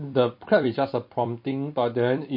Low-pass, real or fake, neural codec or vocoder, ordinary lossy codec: 5.4 kHz; fake; vocoder, 44.1 kHz, 128 mel bands every 256 samples, BigVGAN v2; MP3, 32 kbps